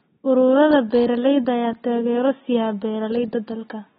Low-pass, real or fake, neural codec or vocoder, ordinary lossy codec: 19.8 kHz; real; none; AAC, 16 kbps